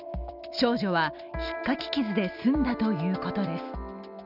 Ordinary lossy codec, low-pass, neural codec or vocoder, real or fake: none; 5.4 kHz; none; real